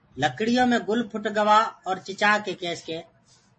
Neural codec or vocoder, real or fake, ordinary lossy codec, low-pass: none; real; MP3, 32 kbps; 10.8 kHz